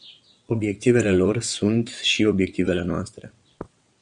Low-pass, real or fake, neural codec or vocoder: 9.9 kHz; fake; vocoder, 22.05 kHz, 80 mel bands, WaveNeXt